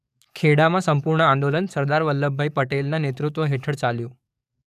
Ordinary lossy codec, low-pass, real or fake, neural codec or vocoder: none; 14.4 kHz; fake; codec, 44.1 kHz, 7.8 kbps, DAC